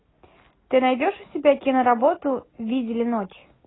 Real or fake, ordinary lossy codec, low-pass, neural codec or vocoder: real; AAC, 16 kbps; 7.2 kHz; none